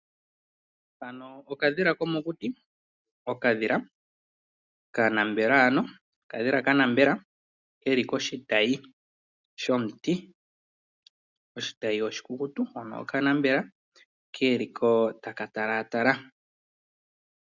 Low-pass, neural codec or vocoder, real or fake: 7.2 kHz; none; real